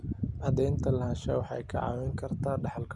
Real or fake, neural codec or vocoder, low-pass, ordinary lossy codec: real; none; none; none